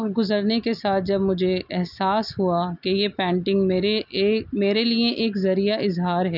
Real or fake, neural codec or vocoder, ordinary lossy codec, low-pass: real; none; none; 5.4 kHz